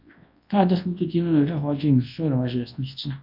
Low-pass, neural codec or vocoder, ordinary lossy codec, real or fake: 5.4 kHz; codec, 24 kHz, 0.9 kbps, WavTokenizer, large speech release; MP3, 48 kbps; fake